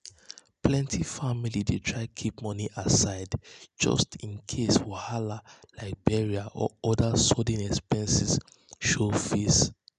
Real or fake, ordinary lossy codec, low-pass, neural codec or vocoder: real; none; 10.8 kHz; none